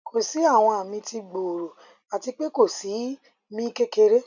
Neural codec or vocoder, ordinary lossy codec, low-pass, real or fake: none; none; 7.2 kHz; real